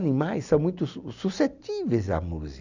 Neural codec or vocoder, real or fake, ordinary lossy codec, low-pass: none; real; none; 7.2 kHz